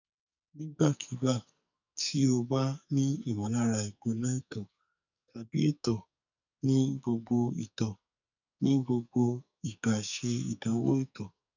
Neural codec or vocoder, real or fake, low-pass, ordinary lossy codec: codec, 44.1 kHz, 2.6 kbps, SNAC; fake; 7.2 kHz; none